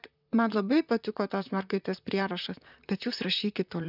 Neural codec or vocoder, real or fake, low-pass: vocoder, 44.1 kHz, 128 mel bands, Pupu-Vocoder; fake; 5.4 kHz